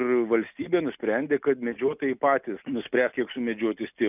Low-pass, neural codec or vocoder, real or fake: 3.6 kHz; none; real